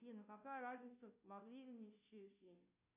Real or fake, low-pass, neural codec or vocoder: fake; 3.6 kHz; codec, 16 kHz, 0.5 kbps, FunCodec, trained on Chinese and English, 25 frames a second